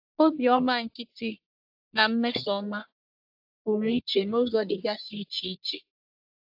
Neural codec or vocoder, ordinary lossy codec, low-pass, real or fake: codec, 44.1 kHz, 1.7 kbps, Pupu-Codec; AAC, 48 kbps; 5.4 kHz; fake